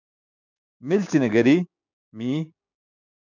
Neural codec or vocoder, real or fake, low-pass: codec, 16 kHz, 6 kbps, DAC; fake; 7.2 kHz